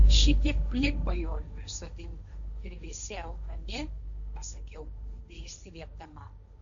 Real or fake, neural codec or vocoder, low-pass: fake; codec, 16 kHz, 1.1 kbps, Voila-Tokenizer; 7.2 kHz